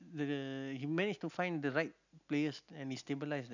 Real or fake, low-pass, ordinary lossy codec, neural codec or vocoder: real; 7.2 kHz; none; none